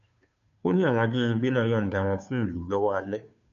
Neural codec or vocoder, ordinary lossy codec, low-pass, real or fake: codec, 16 kHz, 2 kbps, FunCodec, trained on Chinese and English, 25 frames a second; none; 7.2 kHz; fake